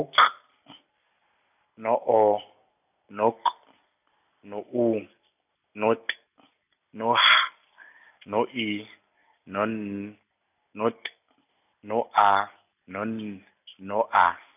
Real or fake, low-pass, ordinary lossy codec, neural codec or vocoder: real; 3.6 kHz; none; none